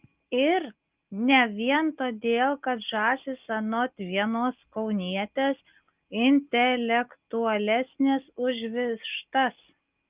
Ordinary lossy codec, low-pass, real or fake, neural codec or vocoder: Opus, 24 kbps; 3.6 kHz; real; none